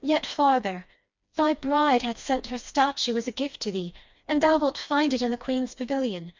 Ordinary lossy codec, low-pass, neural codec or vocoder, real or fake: MP3, 64 kbps; 7.2 kHz; codec, 16 kHz, 2 kbps, FreqCodec, smaller model; fake